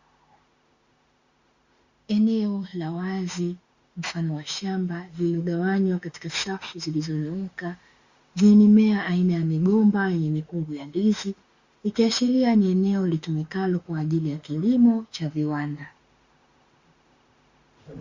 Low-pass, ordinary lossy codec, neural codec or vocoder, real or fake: 7.2 kHz; Opus, 64 kbps; autoencoder, 48 kHz, 32 numbers a frame, DAC-VAE, trained on Japanese speech; fake